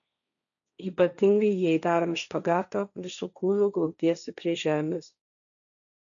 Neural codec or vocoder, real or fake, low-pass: codec, 16 kHz, 1.1 kbps, Voila-Tokenizer; fake; 7.2 kHz